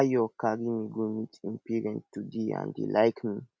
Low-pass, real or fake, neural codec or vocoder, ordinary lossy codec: none; real; none; none